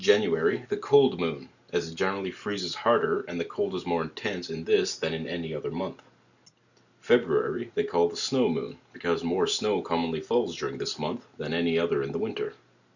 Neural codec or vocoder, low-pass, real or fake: none; 7.2 kHz; real